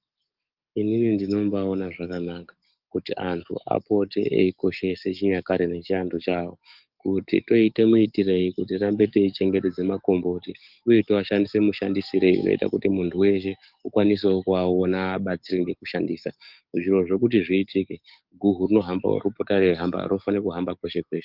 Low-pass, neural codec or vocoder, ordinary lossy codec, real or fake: 5.4 kHz; codec, 44.1 kHz, 7.8 kbps, DAC; Opus, 24 kbps; fake